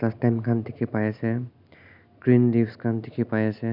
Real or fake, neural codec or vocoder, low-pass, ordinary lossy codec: real; none; 5.4 kHz; none